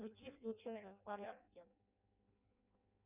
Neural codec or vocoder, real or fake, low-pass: codec, 16 kHz in and 24 kHz out, 0.6 kbps, FireRedTTS-2 codec; fake; 3.6 kHz